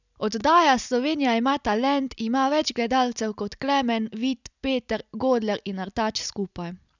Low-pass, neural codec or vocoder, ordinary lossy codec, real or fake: 7.2 kHz; none; none; real